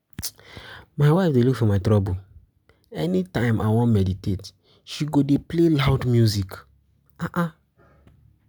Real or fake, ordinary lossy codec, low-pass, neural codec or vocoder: real; none; none; none